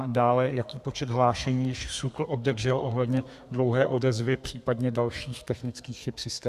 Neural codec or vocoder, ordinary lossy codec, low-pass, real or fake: codec, 32 kHz, 1.9 kbps, SNAC; Opus, 64 kbps; 14.4 kHz; fake